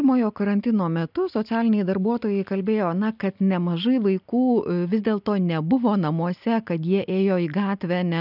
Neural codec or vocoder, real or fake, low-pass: none; real; 5.4 kHz